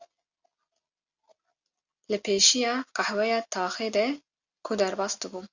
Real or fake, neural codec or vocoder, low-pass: real; none; 7.2 kHz